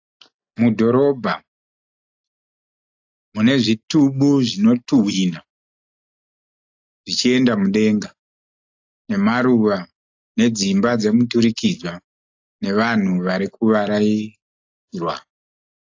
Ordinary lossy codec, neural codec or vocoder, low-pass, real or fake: AAC, 48 kbps; none; 7.2 kHz; real